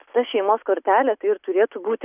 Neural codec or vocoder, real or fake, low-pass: none; real; 3.6 kHz